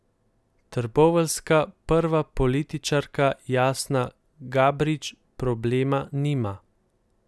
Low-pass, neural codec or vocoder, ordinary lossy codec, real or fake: none; none; none; real